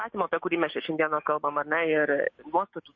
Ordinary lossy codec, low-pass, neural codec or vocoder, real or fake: MP3, 32 kbps; 7.2 kHz; codec, 16 kHz, 6 kbps, DAC; fake